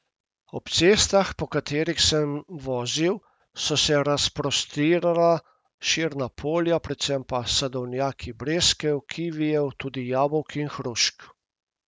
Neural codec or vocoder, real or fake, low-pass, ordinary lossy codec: none; real; none; none